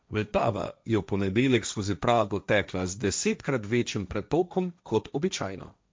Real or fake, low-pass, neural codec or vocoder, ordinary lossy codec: fake; none; codec, 16 kHz, 1.1 kbps, Voila-Tokenizer; none